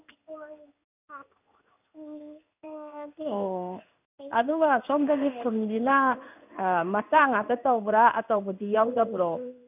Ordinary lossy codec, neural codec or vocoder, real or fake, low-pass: none; codec, 16 kHz in and 24 kHz out, 1 kbps, XY-Tokenizer; fake; 3.6 kHz